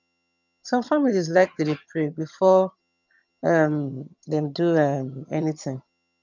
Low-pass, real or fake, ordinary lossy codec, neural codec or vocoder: 7.2 kHz; fake; none; vocoder, 22.05 kHz, 80 mel bands, HiFi-GAN